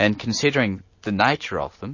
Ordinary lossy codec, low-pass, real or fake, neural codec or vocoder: MP3, 32 kbps; 7.2 kHz; real; none